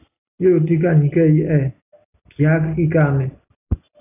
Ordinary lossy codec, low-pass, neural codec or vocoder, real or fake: AAC, 32 kbps; 3.6 kHz; none; real